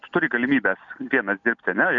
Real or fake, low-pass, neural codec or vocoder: real; 7.2 kHz; none